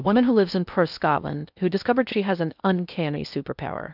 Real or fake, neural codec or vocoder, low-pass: fake; codec, 16 kHz in and 24 kHz out, 0.6 kbps, FocalCodec, streaming, 2048 codes; 5.4 kHz